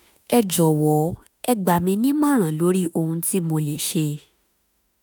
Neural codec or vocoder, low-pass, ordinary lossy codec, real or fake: autoencoder, 48 kHz, 32 numbers a frame, DAC-VAE, trained on Japanese speech; none; none; fake